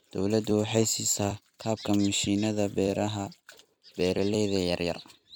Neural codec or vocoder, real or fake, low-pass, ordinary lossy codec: vocoder, 44.1 kHz, 128 mel bands every 256 samples, BigVGAN v2; fake; none; none